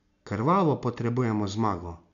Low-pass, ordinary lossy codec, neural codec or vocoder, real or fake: 7.2 kHz; none; none; real